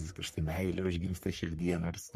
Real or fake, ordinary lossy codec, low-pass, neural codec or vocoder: fake; MP3, 64 kbps; 14.4 kHz; codec, 44.1 kHz, 3.4 kbps, Pupu-Codec